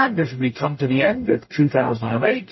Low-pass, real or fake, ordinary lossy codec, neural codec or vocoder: 7.2 kHz; fake; MP3, 24 kbps; codec, 44.1 kHz, 0.9 kbps, DAC